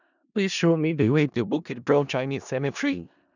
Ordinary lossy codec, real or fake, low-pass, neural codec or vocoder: none; fake; 7.2 kHz; codec, 16 kHz in and 24 kHz out, 0.4 kbps, LongCat-Audio-Codec, four codebook decoder